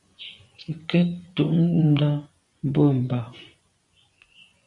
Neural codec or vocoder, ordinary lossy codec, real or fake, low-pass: vocoder, 24 kHz, 100 mel bands, Vocos; MP3, 64 kbps; fake; 10.8 kHz